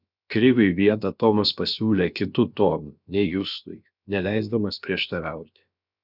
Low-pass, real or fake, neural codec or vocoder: 5.4 kHz; fake; codec, 16 kHz, about 1 kbps, DyCAST, with the encoder's durations